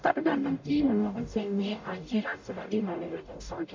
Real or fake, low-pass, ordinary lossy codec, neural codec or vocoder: fake; 7.2 kHz; MP3, 48 kbps; codec, 44.1 kHz, 0.9 kbps, DAC